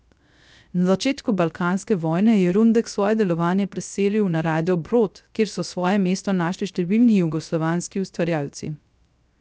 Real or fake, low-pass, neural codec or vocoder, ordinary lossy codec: fake; none; codec, 16 kHz, 0.3 kbps, FocalCodec; none